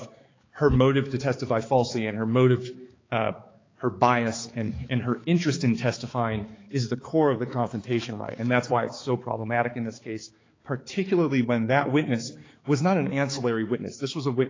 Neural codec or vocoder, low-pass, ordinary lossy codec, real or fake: codec, 16 kHz, 4 kbps, X-Codec, HuBERT features, trained on balanced general audio; 7.2 kHz; AAC, 32 kbps; fake